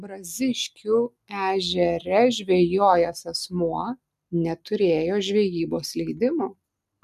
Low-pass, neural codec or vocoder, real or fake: 14.4 kHz; none; real